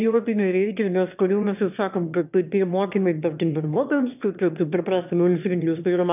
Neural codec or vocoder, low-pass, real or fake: autoencoder, 22.05 kHz, a latent of 192 numbers a frame, VITS, trained on one speaker; 3.6 kHz; fake